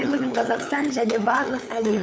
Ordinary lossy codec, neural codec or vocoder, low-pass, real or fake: none; codec, 16 kHz, 8 kbps, FunCodec, trained on LibriTTS, 25 frames a second; none; fake